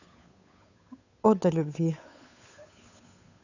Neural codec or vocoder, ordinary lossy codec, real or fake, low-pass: codec, 16 kHz, 8 kbps, FunCodec, trained on Chinese and English, 25 frames a second; none; fake; 7.2 kHz